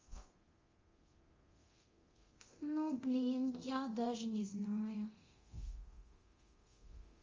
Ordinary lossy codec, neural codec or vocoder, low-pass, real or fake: Opus, 32 kbps; codec, 24 kHz, 0.9 kbps, DualCodec; 7.2 kHz; fake